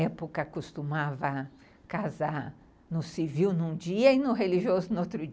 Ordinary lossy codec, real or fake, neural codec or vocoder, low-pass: none; real; none; none